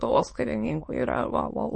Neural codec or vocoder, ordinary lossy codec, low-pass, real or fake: autoencoder, 22.05 kHz, a latent of 192 numbers a frame, VITS, trained on many speakers; MP3, 32 kbps; 9.9 kHz; fake